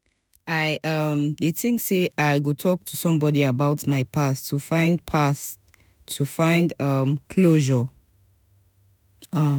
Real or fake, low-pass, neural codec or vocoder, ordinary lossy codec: fake; none; autoencoder, 48 kHz, 32 numbers a frame, DAC-VAE, trained on Japanese speech; none